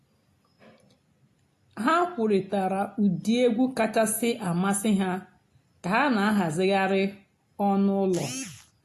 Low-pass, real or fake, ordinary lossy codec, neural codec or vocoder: 14.4 kHz; real; AAC, 48 kbps; none